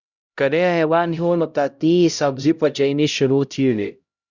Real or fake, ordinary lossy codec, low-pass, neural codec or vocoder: fake; Opus, 64 kbps; 7.2 kHz; codec, 16 kHz, 0.5 kbps, X-Codec, HuBERT features, trained on LibriSpeech